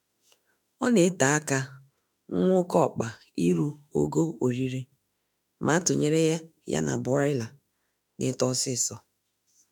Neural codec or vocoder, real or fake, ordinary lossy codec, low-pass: autoencoder, 48 kHz, 32 numbers a frame, DAC-VAE, trained on Japanese speech; fake; none; none